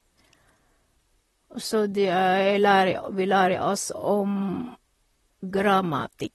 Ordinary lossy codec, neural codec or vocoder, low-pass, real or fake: AAC, 32 kbps; vocoder, 44.1 kHz, 128 mel bands, Pupu-Vocoder; 19.8 kHz; fake